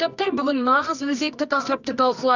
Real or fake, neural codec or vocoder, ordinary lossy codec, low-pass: fake; codec, 24 kHz, 0.9 kbps, WavTokenizer, medium music audio release; none; 7.2 kHz